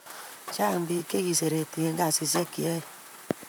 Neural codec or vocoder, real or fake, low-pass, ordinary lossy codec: vocoder, 44.1 kHz, 128 mel bands, Pupu-Vocoder; fake; none; none